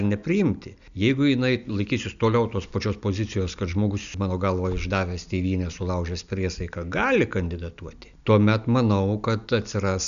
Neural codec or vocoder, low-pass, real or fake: none; 7.2 kHz; real